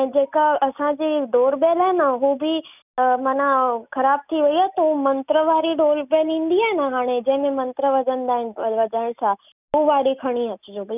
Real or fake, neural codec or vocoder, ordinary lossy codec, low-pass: real; none; none; 3.6 kHz